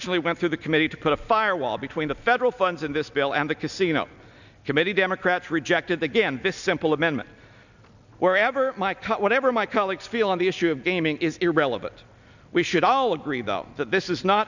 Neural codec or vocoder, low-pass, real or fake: none; 7.2 kHz; real